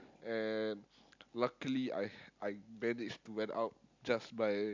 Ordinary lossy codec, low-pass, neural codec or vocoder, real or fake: MP3, 64 kbps; 7.2 kHz; none; real